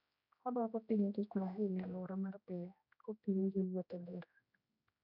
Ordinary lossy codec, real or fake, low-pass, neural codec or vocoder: none; fake; 5.4 kHz; codec, 16 kHz, 1 kbps, X-Codec, HuBERT features, trained on general audio